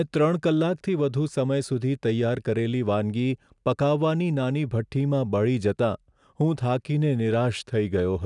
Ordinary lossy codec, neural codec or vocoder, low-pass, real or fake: none; none; 10.8 kHz; real